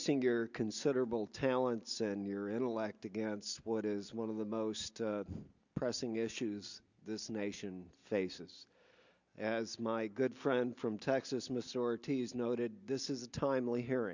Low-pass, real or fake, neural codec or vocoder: 7.2 kHz; real; none